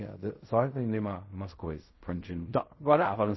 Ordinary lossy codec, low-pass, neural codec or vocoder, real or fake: MP3, 24 kbps; 7.2 kHz; codec, 16 kHz in and 24 kHz out, 0.4 kbps, LongCat-Audio-Codec, fine tuned four codebook decoder; fake